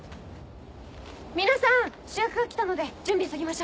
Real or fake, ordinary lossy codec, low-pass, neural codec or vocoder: real; none; none; none